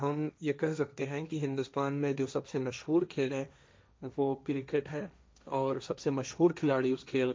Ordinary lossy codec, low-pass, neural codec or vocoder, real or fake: none; none; codec, 16 kHz, 1.1 kbps, Voila-Tokenizer; fake